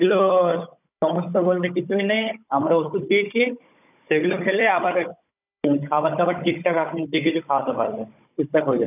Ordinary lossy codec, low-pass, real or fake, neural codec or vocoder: none; 3.6 kHz; fake; codec, 16 kHz, 16 kbps, FunCodec, trained on Chinese and English, 50 frames a second